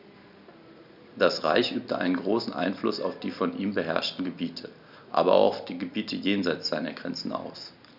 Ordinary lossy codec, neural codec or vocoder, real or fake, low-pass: none; none; real; 5.4 kHz